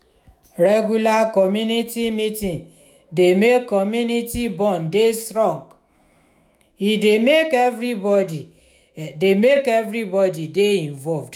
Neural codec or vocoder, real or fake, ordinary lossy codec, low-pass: autoencoder, 48 kHz, 128 numbers a frame, DAC-VAE, trained on Japanese speech; fake; none; 19.8 kHz